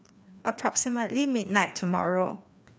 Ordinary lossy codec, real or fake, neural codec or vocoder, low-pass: none; fake; codec, 16 kHz, 2 kbps, FreqCodec, larger model; none